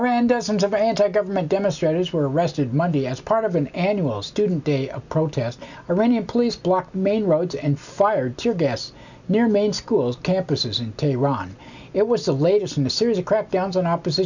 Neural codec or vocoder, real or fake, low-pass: none; real; 7.2 kHz